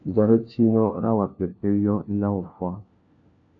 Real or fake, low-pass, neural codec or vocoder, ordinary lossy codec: fake; 7.2 kHz; codec, 16 kHz, 1 kbps, FunCodec, trained on LibriTTS, 50 frames a second; MP3, 96 kbps